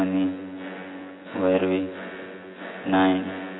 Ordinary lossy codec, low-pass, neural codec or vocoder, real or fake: AAC, 16 kbps; 7.2 kHz; vocoder, 24 kHz, 100 mel bands, Vocos; fake